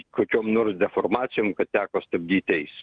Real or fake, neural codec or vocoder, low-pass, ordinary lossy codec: real; none; 9.9 kHz; Opus, 24 kbps